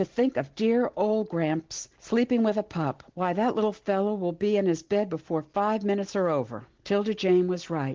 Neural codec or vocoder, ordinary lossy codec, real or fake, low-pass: none; Opus, 16 kbps; real; 7.2 kHz